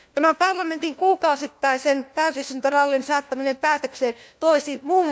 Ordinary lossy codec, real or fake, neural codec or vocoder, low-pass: none; fake; codec, 16 kHz, 1 kbps, FunCodec, trained on LibriTTS, 50 frames a second; none